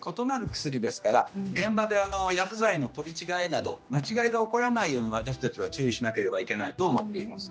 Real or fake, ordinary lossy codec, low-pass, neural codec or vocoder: fake; none; none; codec, 16 kHz, 1 kbps, X-Codec, HuBERT features, trained on general audio